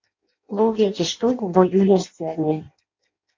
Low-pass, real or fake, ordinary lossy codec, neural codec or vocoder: 7.2 kHz; fake; MP3, 48 kbps; codec, 16 kHz in and 24 kHz out, 0.6 kbps, FireRedTTS-2 codec